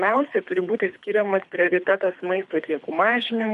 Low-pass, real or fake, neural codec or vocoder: 10.8 kHz; fake; codec, 24 kHz, 3 kbps, HILCodec